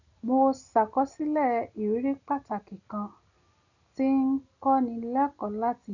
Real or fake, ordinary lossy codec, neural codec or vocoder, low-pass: real; none; none; 7.2 kHz